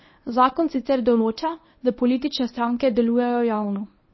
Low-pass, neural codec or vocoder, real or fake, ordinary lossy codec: 7.2 kHz; codec, 24 kHz, 0.9 kbps, WavTokenizer, medium speech release version 1; fake; MP3, 24 kbps